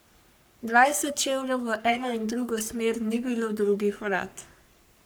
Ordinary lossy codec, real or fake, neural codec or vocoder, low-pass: none; fake; codec, 44.1 kHz, 3.4 kbps, Pupu-Codec; none